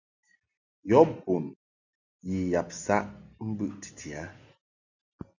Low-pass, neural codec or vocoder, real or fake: 7.2 kHz; none; real